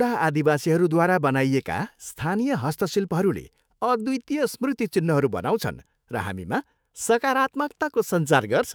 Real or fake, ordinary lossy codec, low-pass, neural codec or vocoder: fake; none; none; autoencoder, 48 kHz, 128 numbers a frame, DAC-VAE, trained on Japanese speech